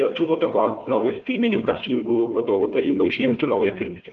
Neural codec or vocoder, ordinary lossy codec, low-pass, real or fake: codec, 16 kHz, 1 kbps, FunCodec, trained on Chinese and English, 50 frames a second; Opus, 32 kbps; 7.2 kHz; fake